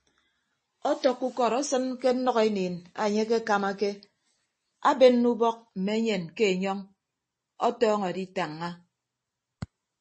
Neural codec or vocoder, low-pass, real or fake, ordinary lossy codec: none; 10.8 kHz; real; MP3, 32 kbps